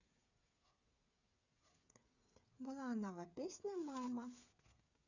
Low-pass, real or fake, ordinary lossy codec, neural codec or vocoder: 7.2 kHz; fake; none; codec, 16 kHz, 8 kbps, FreqCodec, smaller model